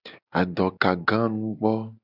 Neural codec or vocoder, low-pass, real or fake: none; 5.4 kHz; real